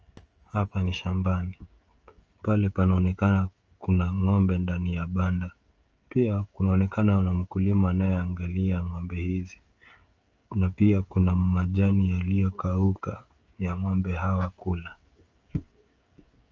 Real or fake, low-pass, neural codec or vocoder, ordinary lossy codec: fake; 7.2 kHz; codec, 16 kHz, 16 kbps, FreqCodec, smaller model; Opus, 24 kbps